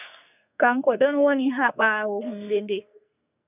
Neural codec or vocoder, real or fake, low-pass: codec, 44.1 kHz, 2.6 kbps, SNAC; fake; 3.6 kHz